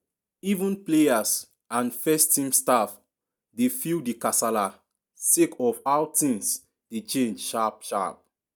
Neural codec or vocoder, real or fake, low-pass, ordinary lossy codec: none; real; none; none